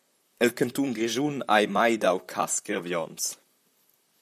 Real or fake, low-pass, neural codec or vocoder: fake; 14.4 kHz; vocoder, 44.1 kHz, 128 mel bands, Pupu-Vocoder